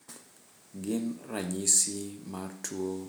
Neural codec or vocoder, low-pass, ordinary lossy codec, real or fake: none; none; none; real